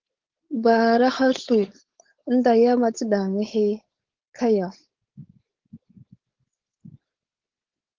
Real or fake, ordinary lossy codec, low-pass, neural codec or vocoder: fake; Opus, 16 kbps; 7.2 kHz; codec, 16 kHz, 4.8 kbps, FACodec